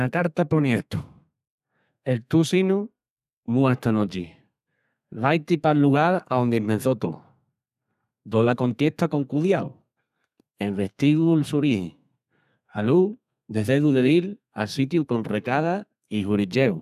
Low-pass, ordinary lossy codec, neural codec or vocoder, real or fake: 14.4 kHz; none; codec, 32 kHz, 1.9 kbps, SNAC; fake